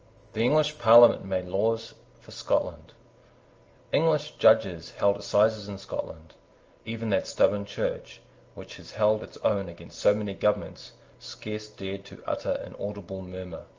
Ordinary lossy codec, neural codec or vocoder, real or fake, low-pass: Opus, 24 kbps; none; real; 7.2 kHz